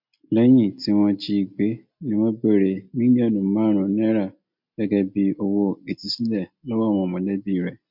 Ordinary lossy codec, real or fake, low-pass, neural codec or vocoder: MP3, 48 kbps; real; 5.4 kHz; none